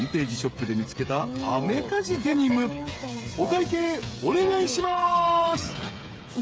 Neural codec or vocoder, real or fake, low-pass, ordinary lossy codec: codec, 16 kHz, 8 kbps, FreqCodec, smaller model; fake; none; none